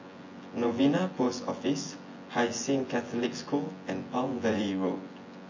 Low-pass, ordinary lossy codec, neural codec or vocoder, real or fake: 7.2 kHz; MP3, 32 kbps; vocoder, 24 kHz, 100 mel bands, Vocos; fake